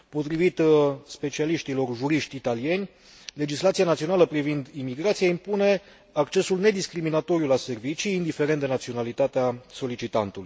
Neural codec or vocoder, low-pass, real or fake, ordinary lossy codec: none; none; real; none